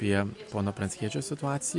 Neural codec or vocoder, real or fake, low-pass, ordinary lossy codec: none; real; 10.8 kHz; MP3, 64 kbps